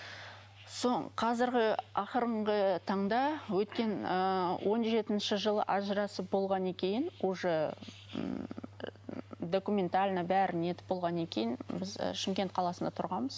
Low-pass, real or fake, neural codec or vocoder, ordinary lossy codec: none; real; none; none